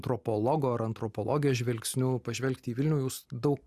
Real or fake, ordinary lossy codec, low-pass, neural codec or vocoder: real; Opus, 64 kbps; 14.4 kHz; none